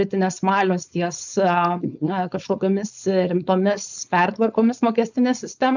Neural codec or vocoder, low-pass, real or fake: codec, 16 kHz, 4.8 kbps, FACodec; 7.2 kHz; fake